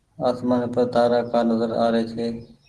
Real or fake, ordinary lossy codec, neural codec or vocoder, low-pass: real; Opus, 16 kbps; none; 10.8 kHz